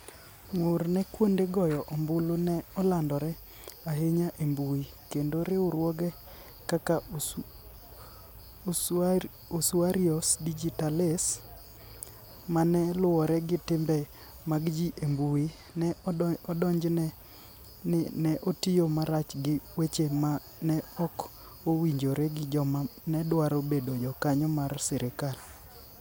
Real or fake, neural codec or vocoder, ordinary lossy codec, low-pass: real; none; none; none